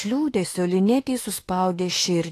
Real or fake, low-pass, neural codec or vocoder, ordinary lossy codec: fake; 14.4 kHz; autoencoder, 48 kHz, 32 numbers a frame, DAC-VAE, trained on Japanese speech; AAC, 48 kbps